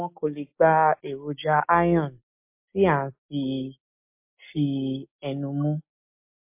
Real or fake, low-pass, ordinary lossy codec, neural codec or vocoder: real; 3.6 kHz; MP3, 32 kbps; none